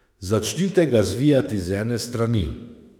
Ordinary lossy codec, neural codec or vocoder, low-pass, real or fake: none; autoencoder, 48 kHz, 32 numbers a frame, DAC-VAE, trained on Japanese speech; 19.8 kHz; fake